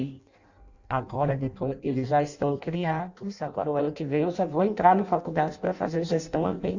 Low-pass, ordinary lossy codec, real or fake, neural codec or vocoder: 7.2 kHz; Opus, 64 kbps; fake; codec, 16 kHz in and 24 kHz out, 0.6 kbps, FireRedTTS-2 codec